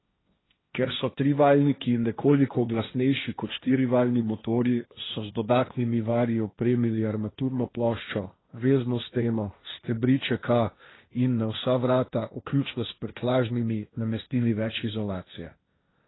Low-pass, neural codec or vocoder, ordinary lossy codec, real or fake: 7.2 kHz; codec, 16 kHz, 1.1 kbps, Voila-Tokenizer; AAC, 16 kbps; fake